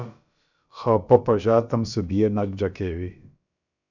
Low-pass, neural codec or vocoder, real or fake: 7.2 kHz; codec, 16 kHz, about 1 kbps, DyCAST, with the encoder's durations; fake